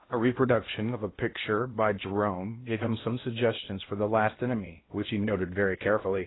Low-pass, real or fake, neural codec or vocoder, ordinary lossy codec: 7.2 kHz; fake; codec, 16 kHz in and 24 kHz out, 0.8 kbps, FocalCodec, streaming, 65536 codes; AAC, 16 kbps